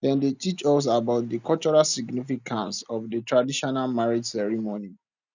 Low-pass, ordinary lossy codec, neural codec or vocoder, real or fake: 7.2 kHz; none; none; real